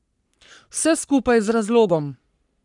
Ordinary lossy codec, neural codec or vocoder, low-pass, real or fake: none; codec, 44.1 kHz, 3.4 kbps, Pupu-Codec; 10.8 kHz; fake